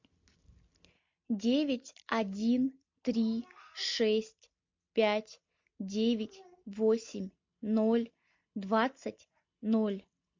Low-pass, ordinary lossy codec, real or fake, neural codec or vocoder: 7.2 kHz; MP3, 48 kbps; real; none